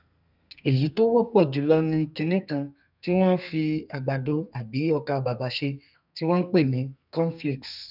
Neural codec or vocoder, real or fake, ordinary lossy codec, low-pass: codec, 32 kHz, 1.9 kbps, SNAC; fake; none; 5.4 kHz